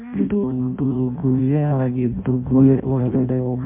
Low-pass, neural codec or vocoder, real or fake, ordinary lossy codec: 3.6 kHz; codec, 16 kHz in and 24 kHz out, 0.6 kbps, FireRedTTS-2 codec; fake; MP3, 32 kbps